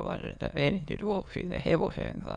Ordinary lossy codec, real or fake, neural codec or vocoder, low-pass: MP3, 96 kbps; fake; autoencoder, 22.05 kHz, a latent of 192 numbers a frame, VITS, trained on many speakers; 9.9 kHz